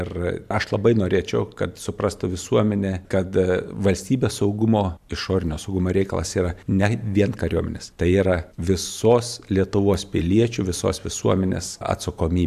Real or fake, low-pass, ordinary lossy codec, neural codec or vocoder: fake; 14.4 kHz; AAC, 96 kbps; vocoder, 44.1 kHz, 128 mel bands every 512 samples, BigVGAN v2